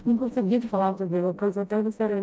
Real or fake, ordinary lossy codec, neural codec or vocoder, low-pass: fake; none; codec, 16 kHz, 0.5 kbps, FreqCodec, smaller model; none